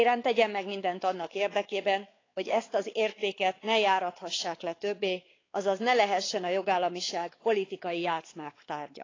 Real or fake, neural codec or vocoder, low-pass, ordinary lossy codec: fake; codec, 16 kHz, 4 kbps, X-Codec, WavLM features, trained on Multilingual LibriSpeech; 7.2 kHz; AAC, 32 kbps